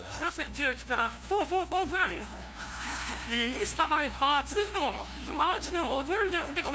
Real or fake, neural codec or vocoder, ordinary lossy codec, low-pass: fake; codec, 16 kHz, 0.5 kbps, FunCodec, trained on LibriTTS, 25 frames a second; none; none